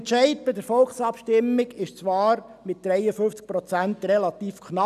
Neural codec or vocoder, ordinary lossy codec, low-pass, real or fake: none; none; 14.4 kHz; real